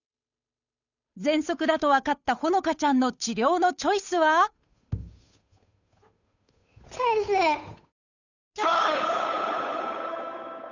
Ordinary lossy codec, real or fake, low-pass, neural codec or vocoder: none; fake; 7.2 kHz; codec, 16 kHz, 8 kbps, FunCodec, trained on Chinese and English, 25 frames a second